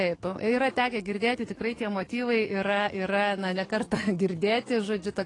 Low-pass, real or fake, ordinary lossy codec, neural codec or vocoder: 10.8 kHz; fake; AAC, 32 kbps; codec, 44.1 kHz, 7.8 kbps, DAC